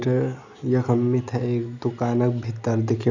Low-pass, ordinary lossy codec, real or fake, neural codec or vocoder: 7.2 kHz; none; real; none